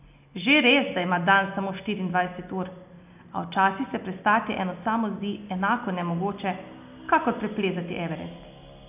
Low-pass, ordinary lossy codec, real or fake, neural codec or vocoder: 3.6 kHz; none; real; none